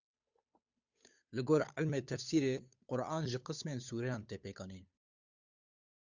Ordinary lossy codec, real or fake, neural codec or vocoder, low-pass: Opus, 64 kbps; fake; codec, 16 kHz, 8 kbps, FunCodec, trained on Chinese and English, 25 frames a second; 7.2 kHz